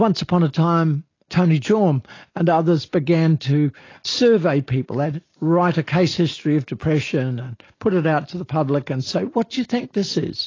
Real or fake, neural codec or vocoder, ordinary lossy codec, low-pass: real; none; AAC, 32 kbps; 7.2 kHz